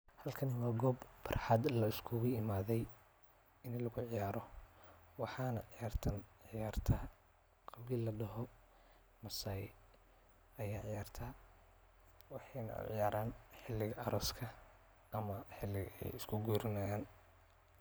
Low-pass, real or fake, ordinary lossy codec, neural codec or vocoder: none; real; none; none